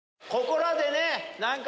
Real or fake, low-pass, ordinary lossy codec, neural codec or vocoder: real; none; none; none